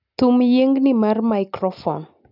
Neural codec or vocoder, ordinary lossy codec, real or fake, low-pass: none; none; real; 5.4 kHz